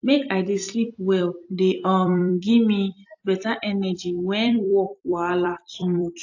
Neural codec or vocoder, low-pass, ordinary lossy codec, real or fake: vocoder, 44.1 kHz, 128 mel bands every 256 samples, BigVGAN v2; 7.2 kHz; AAC, 48 kbps; fake